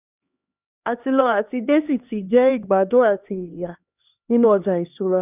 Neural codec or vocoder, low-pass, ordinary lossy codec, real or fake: codec, 16 kHz, 2 kbps, X-Codec, HuBERT features, trained on LibriSpeech; 3.6 kHz; none; fake